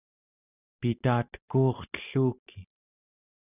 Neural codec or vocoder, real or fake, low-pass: none; real; 3.6 kHz